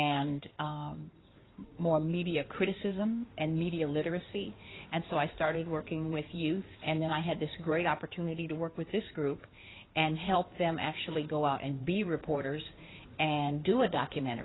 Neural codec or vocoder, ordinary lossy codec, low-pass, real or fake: codec, 16 kHz in and 24 kHz out, 2.2 kbps, FireRedTTS-2 codec; AAC, 16 kbps; 7.2 kHz; fake